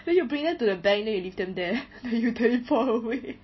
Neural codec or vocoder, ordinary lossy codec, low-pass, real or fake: none; MP3, 24 kbps; 7.2 kHz; real